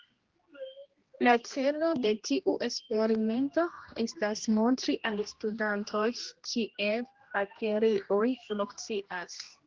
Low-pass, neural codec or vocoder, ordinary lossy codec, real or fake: 7.2 kHz; codec, 16 kHz, 1 kbps, X-Codec, HuBERT features, trained on general audio; Opus, 16 kbps; fake